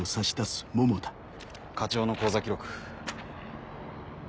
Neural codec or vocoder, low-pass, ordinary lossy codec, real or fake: none; none; none; real